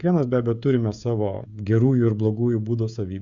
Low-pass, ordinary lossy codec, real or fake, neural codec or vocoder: 7.2 kHz; Opus, 64 kbps; fake; codec, 16 kHz, 16 kbps, FreqCodec, smaller model